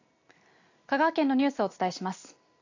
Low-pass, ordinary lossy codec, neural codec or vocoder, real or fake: 7.2 kHz; AAC, 48 kbps; none; real